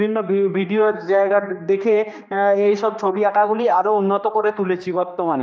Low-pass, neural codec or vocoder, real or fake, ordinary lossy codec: none; codec, 16 kHz, 2 kbps, X-Codec, HuBERT features, trained on general audio; fake; none